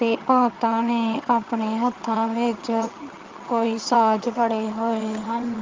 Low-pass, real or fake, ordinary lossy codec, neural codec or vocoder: 7.2 kHz; fake; Opus, 24 kbps; vocoder, 22.05 kHz, 80 mel bands, HiFi-GAN